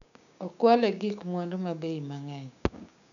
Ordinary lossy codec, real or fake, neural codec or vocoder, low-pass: none; fake; codec, 16 kHz, 6 kbps, DAC; 7.2 kHz